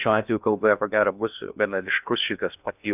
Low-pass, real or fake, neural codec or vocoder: 3.6 kHz; fake; codec, 16 kHz in and 24 kHz out, 0.6 kbps, FocalCodec, streaming, 2048 codes